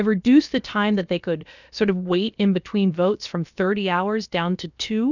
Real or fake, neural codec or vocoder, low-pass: fake; codec, 16 kHz, about 1 kbps, DyCAST, with the encoder's durations; 7.2 kHz